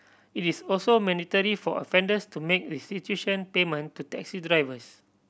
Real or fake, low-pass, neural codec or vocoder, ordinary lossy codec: real; none; none; none